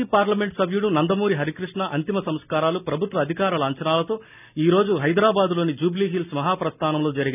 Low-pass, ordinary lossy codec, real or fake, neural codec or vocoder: 3.6 kHz; none; real; none